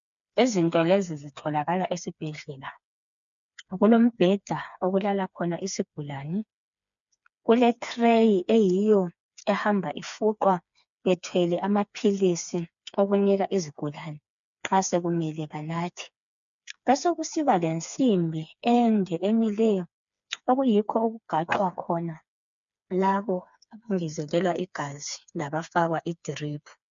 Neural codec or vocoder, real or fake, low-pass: codec, 16 kHz, 4 kbps, FreqCodec, smaller model; fake; 7.2 kHz